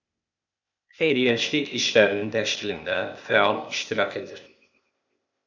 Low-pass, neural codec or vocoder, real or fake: 7.2 kHz; codec, 16 kHz, 0.8 kbps, ZipCodec; fake